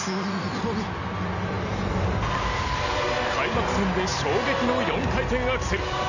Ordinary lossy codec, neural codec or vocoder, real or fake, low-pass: none; none; real; 7.2 kHz